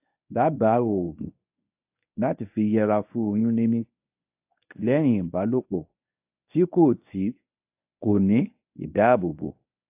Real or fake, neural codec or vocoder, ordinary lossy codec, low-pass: fake; codec, 24 kHz, 0.9 kbps, WavTokenizer, medium speech release version 1; AAC, 32 kbps; 3.6 kHz